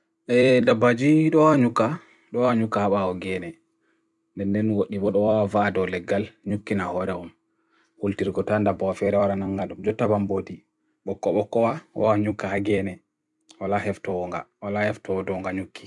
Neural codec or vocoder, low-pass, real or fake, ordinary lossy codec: vocoder, 44.1 kHz, 128 mel bands every 256 samples, BigVGAN v2; 10.8 kHz; fake; none